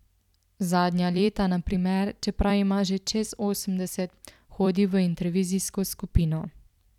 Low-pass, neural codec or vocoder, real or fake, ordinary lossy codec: 19.8 kHz; vocoder, 44.1 kHz, 128 mel bands every 256 samples, BigVGAN v2; fake; none